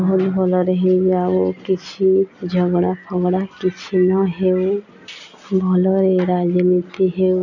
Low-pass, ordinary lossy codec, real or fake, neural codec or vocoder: 7.2 kHz; none; real; none